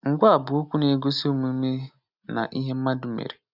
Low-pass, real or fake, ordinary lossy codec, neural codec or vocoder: 5.4 kHz; real; none; none